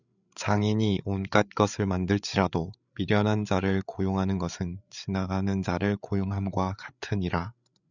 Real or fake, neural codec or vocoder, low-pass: fake; codec, 16 kHz, 8 kbps, FreqCodec, larger model; 7.2 kHz